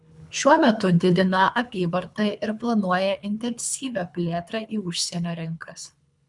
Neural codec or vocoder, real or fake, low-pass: codec, 24 kHz, 3 kbps, HILCodec; fake; 10.8 kHz